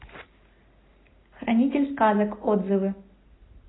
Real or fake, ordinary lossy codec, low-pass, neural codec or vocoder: real; AAC, 16 kbps; 7.2 kHz; none